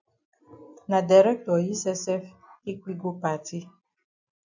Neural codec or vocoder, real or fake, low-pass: none; real; 7.2 kHz